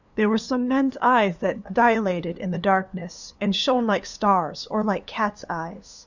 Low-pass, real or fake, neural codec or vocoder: 7.2 kHz; fake; codec, 16 kHz, 2 kbps, FunCodec, trained on LibriTTS, 25 frames a second